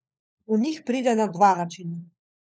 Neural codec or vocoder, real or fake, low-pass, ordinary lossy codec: codec, 16 kHz, 4 kbps, FunCodec, trained on LibriTTS, 50 frames a second; fake; none; none